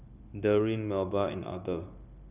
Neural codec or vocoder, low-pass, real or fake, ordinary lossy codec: codec, 16 kHz, 0.9 kbps, LongCat-Audio-Codec; 3.6 kHz; fake; none